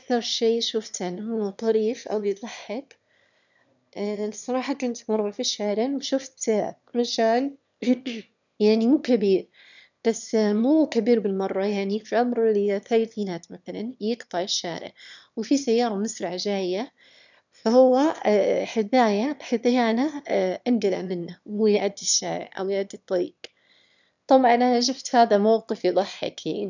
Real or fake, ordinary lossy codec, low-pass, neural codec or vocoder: fake; none; 7.2 kHz; autoencoder, 22.05 kHz, a latent of 192 numbers a frame, VITS, trained on one speaker